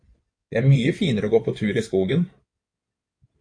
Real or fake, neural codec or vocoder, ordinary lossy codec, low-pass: fake; codec, 16 kHz in and 24 kHz out, 2.2 kbps, FireRedTTS-2 codec; AAC, 48 kbps; 9.9 kHz